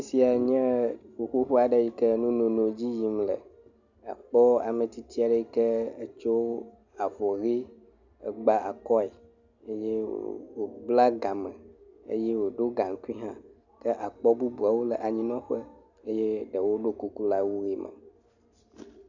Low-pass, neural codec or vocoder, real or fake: 7.2 kHz; none; real